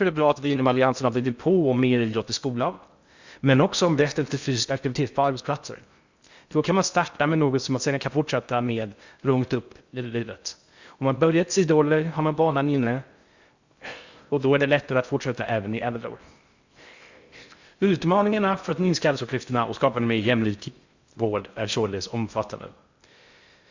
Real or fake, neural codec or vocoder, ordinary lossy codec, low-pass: fake; codec, 16 kHz in and 24 kHz out, 0.6 kbps, FocalCodec, streaming, 2048 codes; Opus, 64 kbps; 7.2 kHz